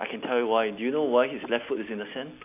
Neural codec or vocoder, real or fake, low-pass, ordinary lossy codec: none; real; 3.6 kHz; none